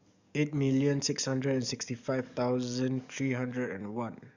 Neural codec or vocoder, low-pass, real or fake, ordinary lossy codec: codec, 44.1 kHz, 7.8 kbps, DAC; 7.2 kHz; fake; none